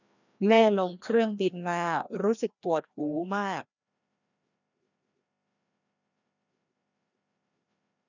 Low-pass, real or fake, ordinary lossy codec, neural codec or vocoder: 7.2 kHz; fake; none; codec, 16 kHz, 1 kbps, FreqCodec, larger model